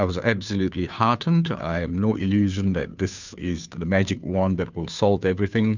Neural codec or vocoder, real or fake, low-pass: codec, 16 kHz, 2 kbps, FunCodec, trained on Chinese and English, 25 frames a second; fake; 7.2 kHz